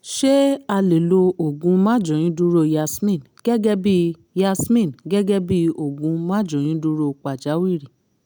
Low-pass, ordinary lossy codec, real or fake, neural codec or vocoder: none; none; real; none